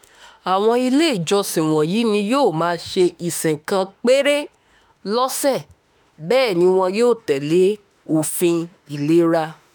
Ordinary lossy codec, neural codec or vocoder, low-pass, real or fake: none; autoencoder, 48 kHz, 32 numbers a frame, DAC-VAE, trained on Japanese speech; none; fake